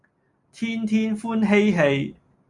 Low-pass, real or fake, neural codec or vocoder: 10.8 kHz; real; none